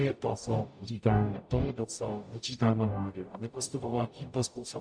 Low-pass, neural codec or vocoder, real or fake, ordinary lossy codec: 9.9 kHz; codec, 44.1 kHz, 0.9 kbps, DAC; fake; MP3, 96 kbps